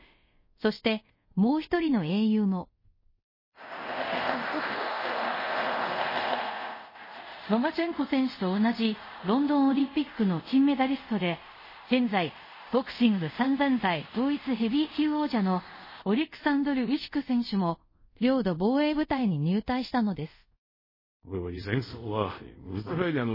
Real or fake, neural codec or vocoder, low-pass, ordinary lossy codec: fake; codec, 24 kHz, 0.5 kbps, DualCodec; 5.4 kHz; MP3, 24 kbps